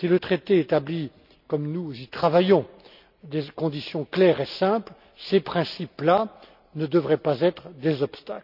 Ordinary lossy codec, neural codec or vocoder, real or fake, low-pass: none; none; real; 5.4 kHz